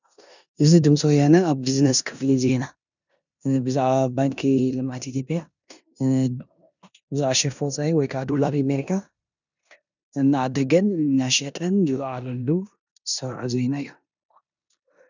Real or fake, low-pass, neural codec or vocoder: fake; 7.2 kHz; codec, 16 kHz in and 24 kHz out, 0.9 kbps, LongCat-Audio-Codec, four codebook decoder